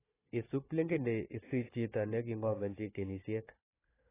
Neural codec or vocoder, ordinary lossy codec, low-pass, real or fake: codec, 16 kHz, 4 kbps, FunCodec, trained on Chinese and English, 50 frames a second; AAC, 16 kbps; 3.6 kHz; fake